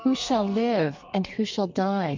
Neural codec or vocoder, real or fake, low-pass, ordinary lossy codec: codec, 32 kHz, 1.9 kbps, SNAC; fake; 7.2 kHz; MP3, 48 kbps